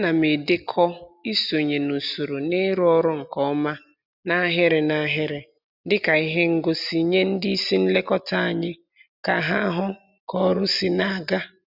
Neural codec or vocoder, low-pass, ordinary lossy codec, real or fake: none; 5.4 kHz; none; real